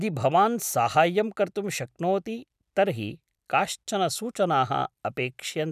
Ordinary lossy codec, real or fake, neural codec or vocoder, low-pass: none; real; none; 14.4 kHz